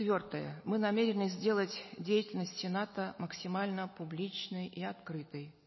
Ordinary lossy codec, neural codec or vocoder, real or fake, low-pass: MP3, 24 kbps; none; real; 7.2 kHz